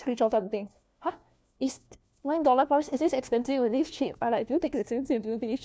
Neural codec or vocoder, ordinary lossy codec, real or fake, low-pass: codec, 16 kHz, 1 kbps, FunCodec, trained on LibriTTS, 50 frames a second; none; fake; none